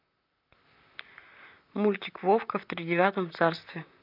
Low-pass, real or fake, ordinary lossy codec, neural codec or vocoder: 5.4 kHz; fake; none; vocoder, 44.1 kHz, 128 mel bands, Pupu-Vocoder